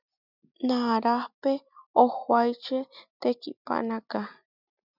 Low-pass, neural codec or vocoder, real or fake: 5.4 kHz; none; real